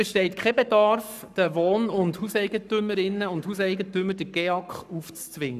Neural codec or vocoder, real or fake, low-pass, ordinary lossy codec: codec, 44.1 kHz, 7.8 kbps, Pupu-Codec; fake; 14.4 kHz; none